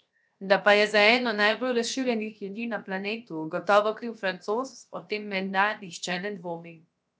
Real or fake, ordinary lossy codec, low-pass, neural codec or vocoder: fake; none; none; codec, 16 kHz, 0.7 kbps, FocalCodec